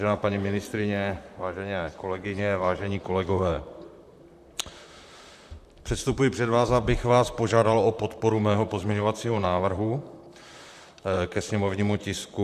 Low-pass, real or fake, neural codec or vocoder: 14.4 kHz; fake; vocoder, 44.1 kHz, 128 mel bands, Pupu-Vocoder